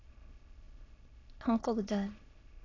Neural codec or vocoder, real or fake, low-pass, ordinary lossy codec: autoencoder, 22.05 kHz, a latent of 192 numbers a frame, VITS, trained on many speakers; fake; 7.2 kHz; AAC, 32 kbps